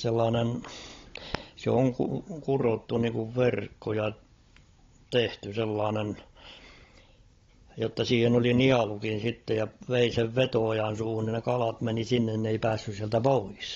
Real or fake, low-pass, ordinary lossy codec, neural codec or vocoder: fake; 7.2 kHz; AAC, 32 kbps; codec, 16 kHz, 16 kbps, FunCodec, trained on Chinese and English, 50 frames a second